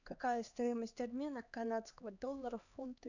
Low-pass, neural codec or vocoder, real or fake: 7.2 kHz; codec, 16 kHz, 2 kbps, X-Codec, HuBERT features, trained on LibriSpeech; fake